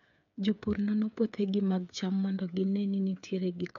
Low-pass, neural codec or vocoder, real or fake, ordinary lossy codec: 7.2 kHz; codec, 16 kHz, 8 kbps, FreqCodec, smaller model; fake; none